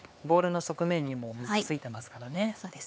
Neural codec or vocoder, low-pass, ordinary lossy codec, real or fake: codec, 16 kHz, 4 kbps, X-Codec, HuBERT features, trained on LibriSpeech; none; none; fake